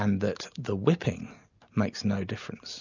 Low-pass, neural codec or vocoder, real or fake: 7.2 kHz; none; real